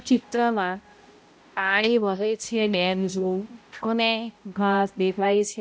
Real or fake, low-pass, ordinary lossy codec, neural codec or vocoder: fake; none; none; codec, 16 kHz, 0.5 kbps, X-Codec, HuBERT features, trained on balanced general audio